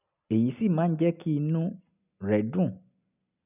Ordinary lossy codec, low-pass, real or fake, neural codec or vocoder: none; 3.6 kHz; real; none